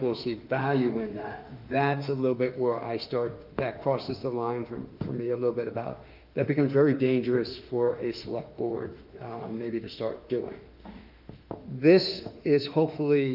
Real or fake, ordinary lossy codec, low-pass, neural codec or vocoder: fake; Opus, 24 kbps; 5.4 kHz; autoencoder, 48 kHz, 32 numbers a frame, DAC-VAE, trained on Japanese speech